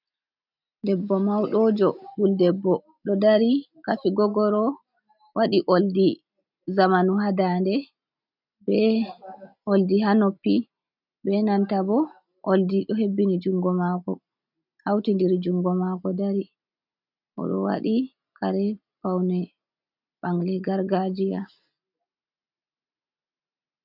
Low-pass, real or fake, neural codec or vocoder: 5.4 kHz; real; none